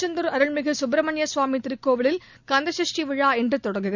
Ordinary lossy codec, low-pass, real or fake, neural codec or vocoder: none; 7.2 kHz; real; none